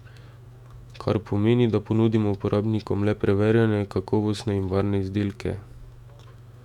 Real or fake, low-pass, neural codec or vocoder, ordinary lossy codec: fake; 19.8 kHz; autoencoder, 48 kHz, 128 numbers a frame, DAC-VAE, trained on Japanese speech; none